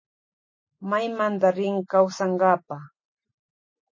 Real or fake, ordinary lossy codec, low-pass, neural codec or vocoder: real; MP3, 32 kbps; 7.2 kHz; none